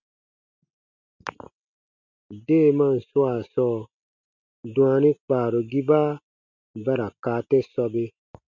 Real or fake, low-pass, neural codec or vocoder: real; 7.2 kHz; none